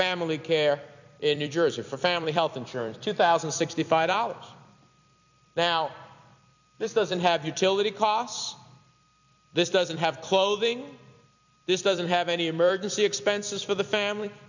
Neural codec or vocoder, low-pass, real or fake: vocoder, 44.1 kHz, 128 mel bands every 256 samples, BigVGAN v2; 7.2 kHz; fake